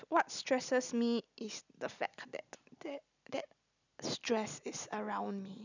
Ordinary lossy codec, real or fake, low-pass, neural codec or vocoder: none; real; 7.2 kHz; none